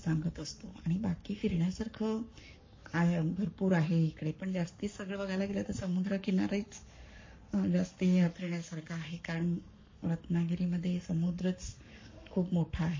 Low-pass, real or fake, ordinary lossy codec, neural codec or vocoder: 7.2 kHz; fake; MP3, 32 kbps; codec, 44.1 kHz, 7.8 kbps, Pupu-Codec